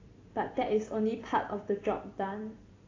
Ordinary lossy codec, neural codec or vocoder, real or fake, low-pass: AAC, 32 kbps; vocoder, 44.1 kHz, 128 mel bands every 256 samples, BigVGAN v2; fake; 7.2 kHz